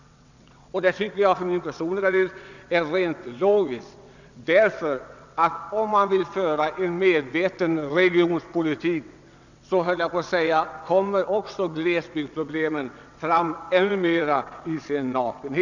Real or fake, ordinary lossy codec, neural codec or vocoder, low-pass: fake; none; vocoder, 22.05 kHz, 80 mel bands, WaveNeXt; 7.2 kHz